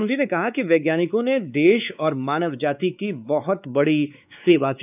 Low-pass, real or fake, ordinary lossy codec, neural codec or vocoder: 3.6 kHz; fake; none; codec, 16 kHz, 4 kbps, X-Codec, WavLM features, trained on Multilingual LibriSpeech